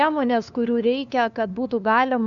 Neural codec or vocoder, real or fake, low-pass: codec, 16 kHz, 2 kbps, FunCodec, trained on LibriTTS, 25 frames a second; fake; 7.2 kHz